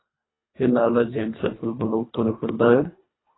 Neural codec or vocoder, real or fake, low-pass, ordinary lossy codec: codec, 24 kHz, 1.5 kbps, HILCodec; fake; 7.2 kHz; AAC, 16 kbps